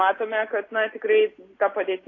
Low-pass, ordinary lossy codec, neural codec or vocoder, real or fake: 7.2 kHz; AAC, 32 kbps; none; real